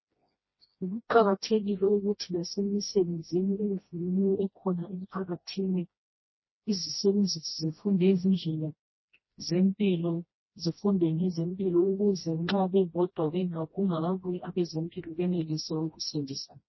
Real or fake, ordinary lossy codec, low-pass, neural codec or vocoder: fake; MP3, 24 kbps; 7.2 kHz; codec, 16 kHz, 1 kbps, FreqCodec, smaller model